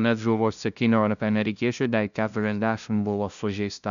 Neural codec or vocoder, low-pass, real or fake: codec, 16 kHz, 0.5 kbps, FunCodec, trained on LibriTTS, 25 frames a second; 7.2 kHz; fake